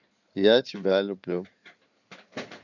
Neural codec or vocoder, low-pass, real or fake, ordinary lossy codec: codec, 16 kHz in and 24 kHz out, 2.2 kbps, FireRedTTS-2 codec; 7.2 kHz; fake; none